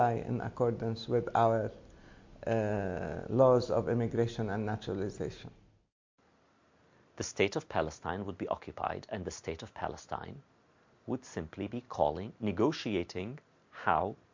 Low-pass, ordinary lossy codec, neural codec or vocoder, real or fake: 7.2 kHz; MP3, 48 kbps; none; real